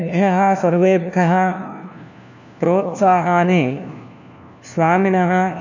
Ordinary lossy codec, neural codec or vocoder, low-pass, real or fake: none; codec, 16 kHz, 1 kbps, FunCodec, trained on LibriTTS, 50 frames a second; 7.2 kHz; fake